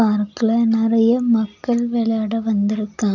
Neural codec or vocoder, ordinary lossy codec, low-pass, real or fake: none; none; 7.2 kHz; real